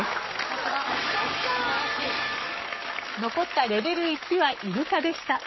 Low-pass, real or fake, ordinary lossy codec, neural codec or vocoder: 7.2 kHz; fake; MP3, 24 kbps; codec, 44.1 kHz, 7.8 kbps, Pupu-Codec